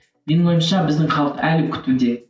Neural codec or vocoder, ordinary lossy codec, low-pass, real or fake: none; none; none; real